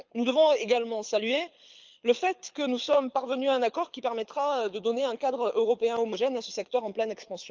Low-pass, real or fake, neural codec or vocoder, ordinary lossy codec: 7.2 kHz; fake; codec, 24 kHz, 6 kbps, HILCodec; Opus, 24 kbps